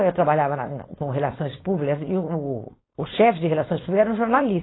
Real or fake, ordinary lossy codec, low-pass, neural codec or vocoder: fake; AAC, 16 kbps; 7.2 kHz; codec, 16 kHz, 4.8 kbps, FACodec